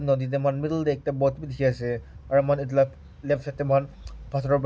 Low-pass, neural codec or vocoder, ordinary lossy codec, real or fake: none; none; none; real